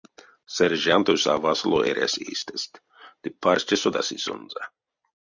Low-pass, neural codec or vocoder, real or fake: 7.2 kHz; none; real